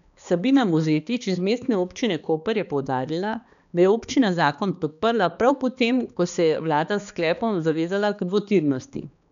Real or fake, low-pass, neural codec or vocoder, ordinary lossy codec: fake; 7.2 kHz; codec, 16 kHz, 2 kbps, X-Codec, HuBERT features, trained on balanced general audio; none